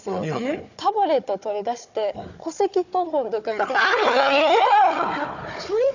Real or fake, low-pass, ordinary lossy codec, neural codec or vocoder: fake; 7.2 kHz; none; codec, 16 kHz, 4 kbps, FunCodec, trained on Chinese and English, 50 frames a second